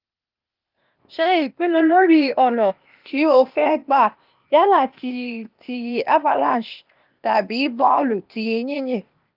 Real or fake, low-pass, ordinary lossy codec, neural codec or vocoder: fake; 5.4 kHz; Opus, 24 kbps; codec, 16 kHz, 0.8 kbps, ZipCodec